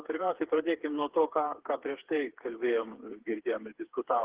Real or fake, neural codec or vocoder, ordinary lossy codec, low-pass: fake; codec, 16 kHz, 4 kbps, FreqCodec, smaller model; Opus, 16 kbps; 3.6 kHz